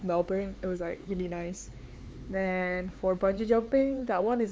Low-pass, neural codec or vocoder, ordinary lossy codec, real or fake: none; codec, 16 kHz, 4 kbps, X-Codec, HuBERT features, trained on LibriSpeech; none; fake